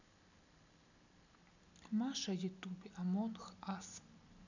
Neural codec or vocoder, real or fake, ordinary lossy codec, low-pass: none; real; none; 7.2 kHz